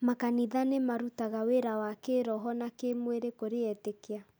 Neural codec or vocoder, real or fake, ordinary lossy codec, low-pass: none; real; none; none